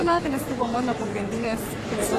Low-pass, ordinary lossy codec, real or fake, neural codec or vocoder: 14.4 kHz; AAC, 48 kbps; fake; codec, 44.1 kHz, 3.4 kbps, Pupu-Codec